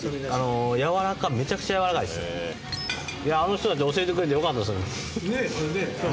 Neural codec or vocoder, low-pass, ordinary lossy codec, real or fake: none; none; none; real